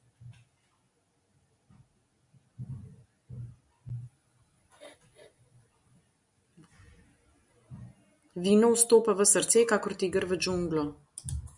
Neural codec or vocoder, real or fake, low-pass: none; real; 10.8 kHz